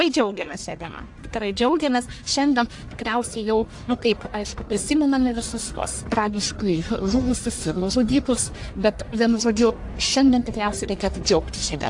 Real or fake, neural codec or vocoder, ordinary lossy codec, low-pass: fake; codec, 44.1 kHz, 1.7 kbps, Pupu-Codec; AAC, 64 kbps; 10.8 kHz